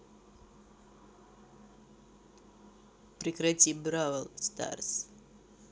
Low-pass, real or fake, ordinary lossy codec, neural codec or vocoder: none; real; none; none